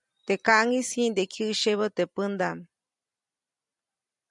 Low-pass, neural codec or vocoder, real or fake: 10.8 kHz; vocoder, 44.1 kHz, 128 mel bands every 256 samples, BigVGAN v2; fake